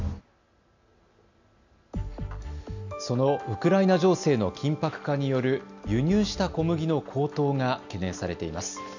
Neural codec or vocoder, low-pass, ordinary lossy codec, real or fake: none; 7.2 kHz; none; real